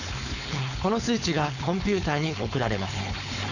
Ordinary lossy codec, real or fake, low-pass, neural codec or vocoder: none; fake; 7.2 kHz; codec, 16 kHz, 4.8 kbps, FACodec